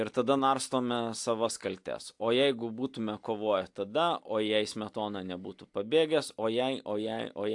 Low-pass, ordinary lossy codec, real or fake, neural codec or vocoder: 10.8 kHz; AAC, 64 kbps; real; none